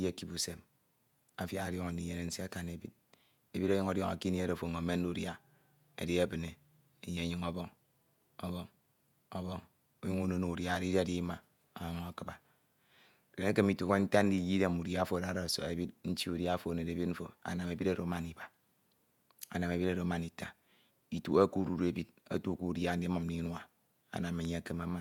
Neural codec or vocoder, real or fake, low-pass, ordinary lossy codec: vocoder, 48 kHz, 128 mel bands, Vocos; fake; 19.8 kHz; none